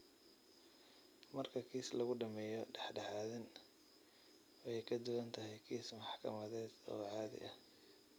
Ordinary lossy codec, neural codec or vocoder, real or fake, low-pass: none; none; real; none